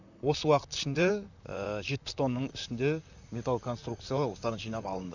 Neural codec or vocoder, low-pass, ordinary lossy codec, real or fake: vocoder, 44.1 kHz, 128 mel bands, Pupu-Vocoder; 7.2 kHz; none; fake